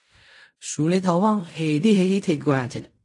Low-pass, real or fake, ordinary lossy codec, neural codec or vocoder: 10.8 kHz; fake; AAC, 64 kbps; codec, 16 kHz in and 24 kHz out, 0.4 kbps, LongCat-Audio-Codec, fine tuned four codebook decoder